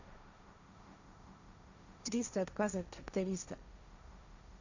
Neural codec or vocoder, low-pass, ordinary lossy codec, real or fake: codec, 16 kHz, 1.1 kbps, Voila-Tokenizer; 7.2 kHz; Opus, 64 kbps; fake